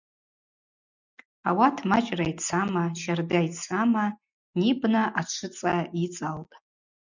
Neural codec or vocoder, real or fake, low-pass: none; real; 7.2 kHz